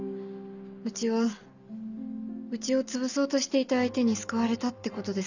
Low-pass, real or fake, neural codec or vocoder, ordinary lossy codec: 7.2 kHz; real; none; none